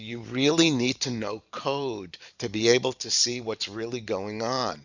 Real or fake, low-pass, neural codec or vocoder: fake; 7.2 kHz; vocoder, 44.1 kHz, 128 mel bands every 512 samples, BigVGAN v2